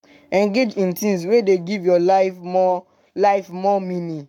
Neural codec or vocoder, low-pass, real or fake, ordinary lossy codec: codec, 44.1 kHz, 7.8 kbps, Pupu-Codec; 19.8 kHz; fake; none